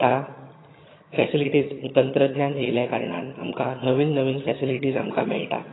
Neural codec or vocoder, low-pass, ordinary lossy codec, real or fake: vocoder, 22.05 kHz, 80 mel bands, HiFi-GAN; 7.2 kHz; AAC, 16 kbps; fake